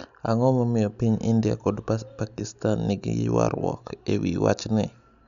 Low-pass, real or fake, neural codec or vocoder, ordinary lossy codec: 7.2 kHz; real; none; none